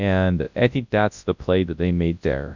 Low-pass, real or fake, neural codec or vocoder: 7.2 kHz; fake; codec, 24 kHz, 0.9 kbps, WavTokenizer, large speech release